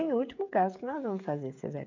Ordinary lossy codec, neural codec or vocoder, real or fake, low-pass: MP3, 64 kbps; codec, 16 kHz, 16 kbps, FreqCodec, smaller model; fake; 7.2 kHz